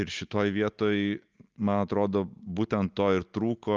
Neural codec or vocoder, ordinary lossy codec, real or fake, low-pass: none; Opus, 32 kbps; real; 7.2 kHz